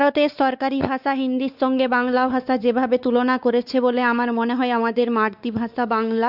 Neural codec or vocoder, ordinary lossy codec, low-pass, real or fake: codec, 16 kHz, 4 kbps, X-Codec, WavLM features, trained on Multilingual LibriSpeech; none; 5.4 kHz; fake